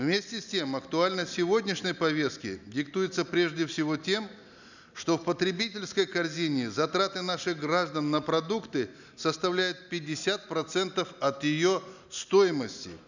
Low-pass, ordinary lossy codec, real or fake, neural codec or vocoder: 7.2 kHz; none; real; none